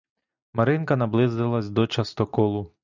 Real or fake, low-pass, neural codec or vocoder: real; 7.2 kHz; none